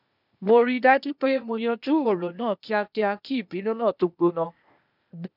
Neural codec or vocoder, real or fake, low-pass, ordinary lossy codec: codec, 16 kHz, 0.8 kbps, ZipCodec; fake; 5.4 kHz; none